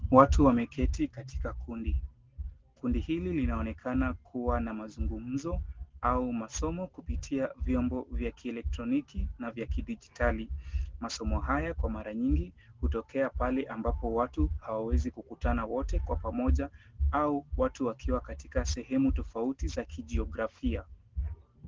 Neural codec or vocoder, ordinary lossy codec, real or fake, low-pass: none; Opus, 16 kbps; real; 7.2 kHz